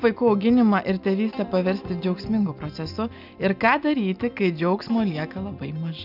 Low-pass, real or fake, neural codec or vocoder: 5.4 kHz; real; none